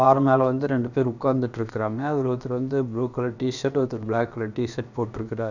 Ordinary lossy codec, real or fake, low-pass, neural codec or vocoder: none; fake; 7.2 kHz; codec, 16 kHz, about 1 kbps, DyCAST, with the encoder's durations